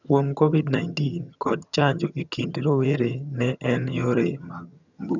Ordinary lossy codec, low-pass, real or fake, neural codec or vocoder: none; 7.2 kHz; fake; vocoder, 22.05 kHz, 80 mel bands, HiFi-GAN